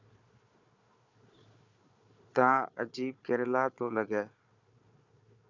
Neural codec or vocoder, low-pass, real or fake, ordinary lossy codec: codec, 16 kHz, 4 kbps, FunCodec, trained on Chinese and English, 50 frames a second; 7.2 kHz; fake; Opus, 64 kbps